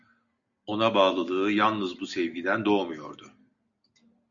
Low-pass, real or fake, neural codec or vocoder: 7.2 kHz; real; none